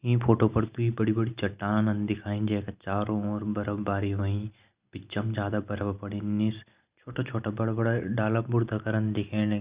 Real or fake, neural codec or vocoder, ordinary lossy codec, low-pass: real; none; Opus, 64 kbps; 3.6 kHz